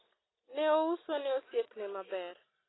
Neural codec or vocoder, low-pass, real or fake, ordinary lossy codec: none; 7.2 kHz; real; AAC, 16 kbps